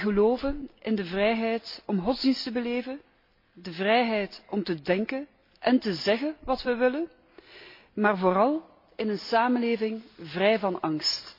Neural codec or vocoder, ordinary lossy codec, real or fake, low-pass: none; MP3, 32 kbps; real; 5.4 kHz